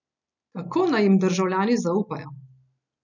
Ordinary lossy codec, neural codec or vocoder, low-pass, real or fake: none; none; 7.2 kHz; real